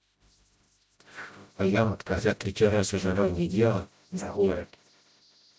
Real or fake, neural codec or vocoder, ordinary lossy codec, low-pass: fake; codec, 16 kHz, 0.5 kbps, FreqCodec, smaller model; none; none